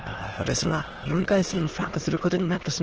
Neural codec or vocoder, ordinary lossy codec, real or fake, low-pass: autoencoder, 22.05 kHz, a latent of 192 numbers a frame, VITS, trained on many speakers; Opus, 16 kbps; fake; 7.2 kHz